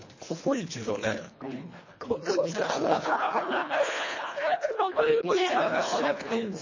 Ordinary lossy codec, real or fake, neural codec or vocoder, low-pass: MP3, 32 kbps; fake; codec, 24 kHz, 1.5 kbps, HILCodec; 7.2 kHz